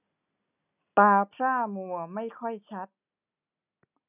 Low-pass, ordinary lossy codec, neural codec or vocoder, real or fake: 3.6 kHz; none; none; real